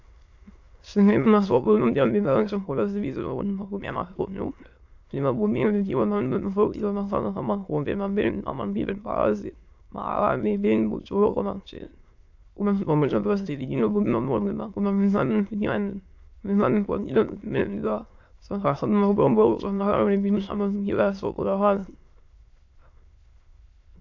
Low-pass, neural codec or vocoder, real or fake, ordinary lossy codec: 7.2 kHz; autoencoder, 22.05 kHz, a latent of 192 numbers a frame, VITS, trained on many speakers; fake; AAC, 48 kbps